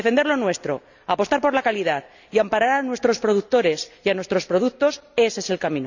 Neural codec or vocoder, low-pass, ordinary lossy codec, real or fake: none; 7.2 kHz; none; real